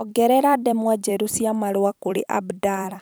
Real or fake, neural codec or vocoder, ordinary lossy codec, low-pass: fake; vocoder, 44.1 kHz, 128 mel bands every 256 samples, BigVGAN v2; none; none